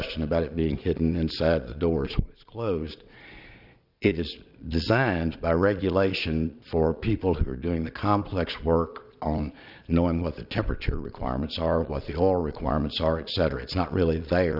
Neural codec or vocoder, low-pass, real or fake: vocoder, 22.05 kHz, 80 mel bands, Vocos; 5.4 kHz; fake